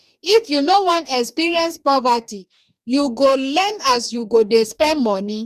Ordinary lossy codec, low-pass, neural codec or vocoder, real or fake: none; 14.4 kHz; codec, 44.1 kHz, 2.6 kbps, DAC; fake